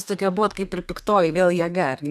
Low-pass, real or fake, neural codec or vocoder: 14.4 kHz; fake; codec, 32 kHz, 1.9 kbps, SNAC